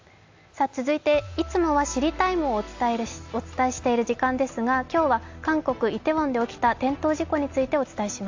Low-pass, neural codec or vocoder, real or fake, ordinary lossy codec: 7.2 kHz; none; real; AAC, 48 kbps